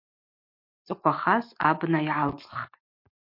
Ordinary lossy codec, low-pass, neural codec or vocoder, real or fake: MP3, 32 kbps; 5.4 kHz; none; real